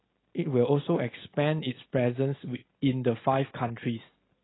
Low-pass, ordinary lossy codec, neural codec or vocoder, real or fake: 7.2 kHz; AAC, 16 kbps; none; real